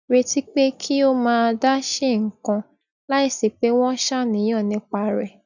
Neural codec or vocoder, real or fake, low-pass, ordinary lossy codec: none; real; 7.2 kHz; none